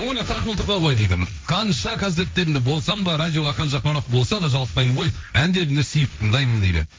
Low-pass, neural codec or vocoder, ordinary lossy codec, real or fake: none; codec, 16 kHz, 1.1 kbps, Voila-Tokenizer; none; fake